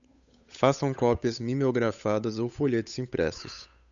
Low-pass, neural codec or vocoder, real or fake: 7.2 kHz; codec, 16 kHz, 8 kbps, FunCodec, trained on Chinese and English, 25 frames a second; fake